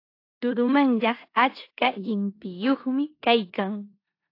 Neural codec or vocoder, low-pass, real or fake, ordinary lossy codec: codec, 16 kHz in and 24 kHz out, 0.9 kbps, LongCat-Audio-Codec, four codebook decoder; 5.4 kHz; fake; AAC, 32 kbps